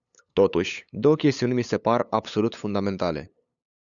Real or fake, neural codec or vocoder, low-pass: fake; codec, 16 kHz, 8 kbps, FunCodec, trained on LibriTTS, 25 frames a second; 7.2 kHz